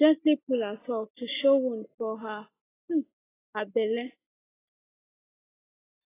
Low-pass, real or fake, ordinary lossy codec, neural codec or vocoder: 3.6 kHz; real; AAC, 16 kbps; none